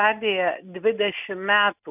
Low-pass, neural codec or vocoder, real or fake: 3.6 kHz; none; real